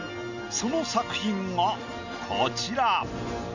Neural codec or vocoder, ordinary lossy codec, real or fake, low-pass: none; none; real; 7.2 kHz